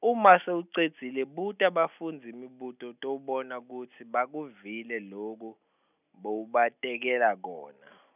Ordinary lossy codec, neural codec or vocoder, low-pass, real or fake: none; none; 3.6 kHz; real